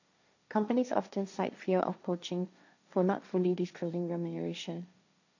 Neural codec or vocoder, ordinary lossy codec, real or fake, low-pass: codec, 16 kHz, 1.1 kbps, Voila-Tokenizer; none; fake; 7.2 kHz